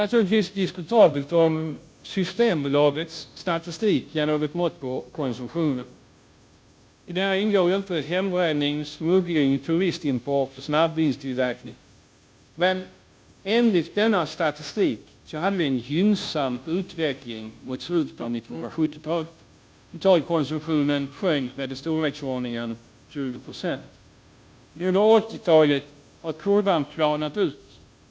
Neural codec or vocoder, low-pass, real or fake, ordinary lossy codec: codec, 16 kHz, 0.5 kbps, FunCodec, trained on Chinese and English, 25 frames a second; none; fake; none